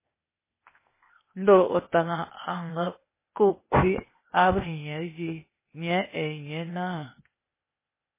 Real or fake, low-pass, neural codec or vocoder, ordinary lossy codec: fake; 3.6 kHz; codec, 16 kHz, 0.8 kbps, ZipCodec; MP3, 16 kbps